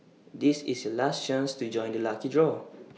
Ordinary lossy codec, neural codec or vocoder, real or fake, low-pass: none; none; real; none